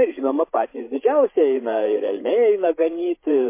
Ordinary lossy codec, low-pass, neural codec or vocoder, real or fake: MP3, 24 kbps; 3.6 kHz; codec, 16 kHz, 8 kbps, FreqCodec, larger model; fake